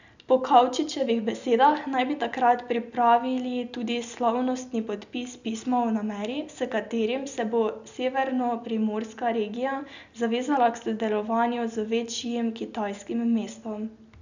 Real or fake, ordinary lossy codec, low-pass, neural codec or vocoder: real; none; 7.2 kHz; none